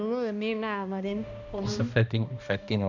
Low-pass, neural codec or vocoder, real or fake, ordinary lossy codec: 7.2 kHz; codec, 16 kHz, 1 kbps, X-Codec, HuBERT features, trained on balanced general audio; fake; none